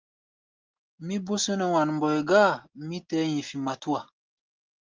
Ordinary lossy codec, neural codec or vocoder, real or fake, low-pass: Opus, 32 kbps; none; real; 7.2 kHz